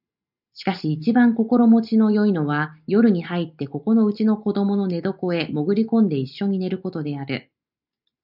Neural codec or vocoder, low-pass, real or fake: none; 5.4 kHz; real